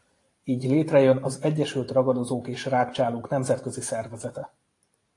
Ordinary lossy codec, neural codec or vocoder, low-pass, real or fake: AAC, 48 kbps; vocoder, 44.1 kHz, 128 mel bands every 512 samples, BigVGAN v2; 10.8 kHz; fake